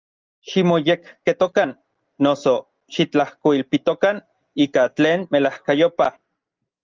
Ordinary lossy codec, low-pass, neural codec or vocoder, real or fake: Opus, 32 kbps; 7.2 kHz; none; real